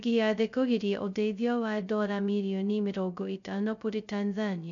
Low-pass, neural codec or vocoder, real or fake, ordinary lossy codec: 7.2 kHz; codec, 16 kHz, 0.2 kbps, FocalCodec; fake; none